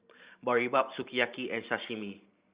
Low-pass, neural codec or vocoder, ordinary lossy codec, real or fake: 3.6 kHz; vocoder, 44.1 kHz, 128 mel bands every 512 samples, BigVGAN v2; Opus, 64 kbps; fake